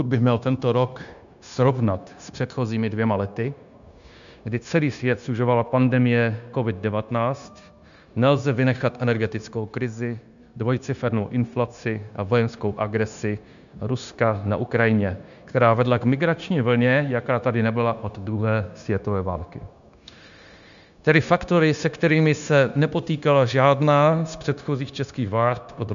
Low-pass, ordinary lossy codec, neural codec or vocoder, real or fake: 7.2 kHz; MP3, 96 kbps; codec, 16 kHz, 0.9 kbps, LongCat-Audio-Codec; fake